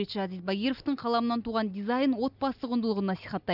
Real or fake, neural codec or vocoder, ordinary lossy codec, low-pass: real; none; none; 5.4 kHz